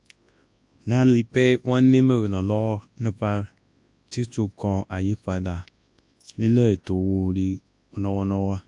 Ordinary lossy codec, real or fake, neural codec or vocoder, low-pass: AAC, 64 kbps; fake; codec, 24 kHz, 0.9 kbps, WavTokenizer, large speech release; 10.8 kHz